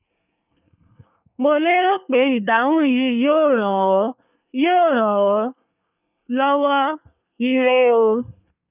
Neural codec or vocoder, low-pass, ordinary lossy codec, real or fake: codec, 24 kHz, 1 kbps, SNAC; 3.6 kHz; none; fake